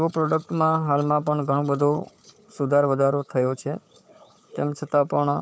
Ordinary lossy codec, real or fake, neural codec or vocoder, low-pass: none; fake; codec, 16 kHz, 4 kbps, FunCodec, trained on Chinese and English, 50 frames a second; none